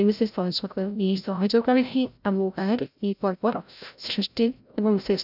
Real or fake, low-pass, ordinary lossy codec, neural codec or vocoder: fake; 5.4 kHz; none; codec, 16 kHz, 0.5 kbps, FreqCodec, larger model